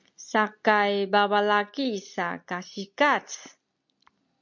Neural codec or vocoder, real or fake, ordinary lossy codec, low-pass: none; real; MP3, 48 kbps; 7.2 kHz